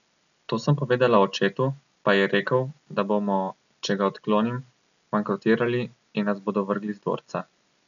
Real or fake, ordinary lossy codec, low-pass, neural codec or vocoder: real; none; 7.2 kHz; none